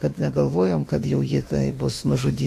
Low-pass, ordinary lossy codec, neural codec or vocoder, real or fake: 14.4 kHz; AAC, 64 kbps; autoencoder, 48 kHz, 32 numbers a frame, DAC-VAE, trained on Japanese speech; fake